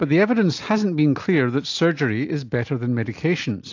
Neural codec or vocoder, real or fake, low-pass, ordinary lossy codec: none; real; 7.2 kHz; AAC, 48 kbps